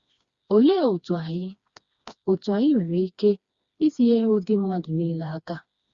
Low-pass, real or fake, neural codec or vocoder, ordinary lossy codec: 7.2 kHz; fake; codec, 16 kHz, 2 kbps, FreqCodec, smaller model; Opus, 64 kbps